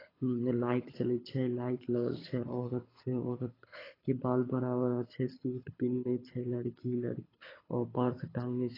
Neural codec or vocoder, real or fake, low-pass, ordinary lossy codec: codec, 24 kHz, 6 kbps, HILCodec; fake; 5.4 kHz; AAC, 24 kbps